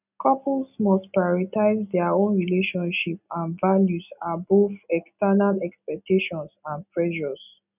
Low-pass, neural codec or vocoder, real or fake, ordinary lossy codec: 3.6 kHz; none; real; none